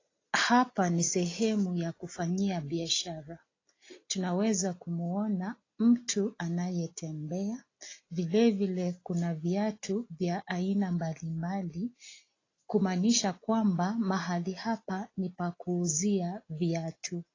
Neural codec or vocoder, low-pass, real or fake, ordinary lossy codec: none; 7.2 kHz; real; AAC, 32 kbps